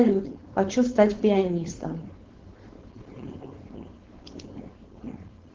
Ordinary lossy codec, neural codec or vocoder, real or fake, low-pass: Opus, 16 kbps; codec, 16 kHz, 4.8 kbps, FACodec; fake; 7.2 kHz